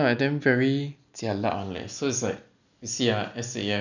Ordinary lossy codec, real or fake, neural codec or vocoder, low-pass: none; real; none; 7.2 kHz